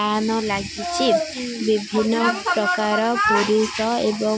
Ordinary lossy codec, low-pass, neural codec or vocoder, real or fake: none; none; none; real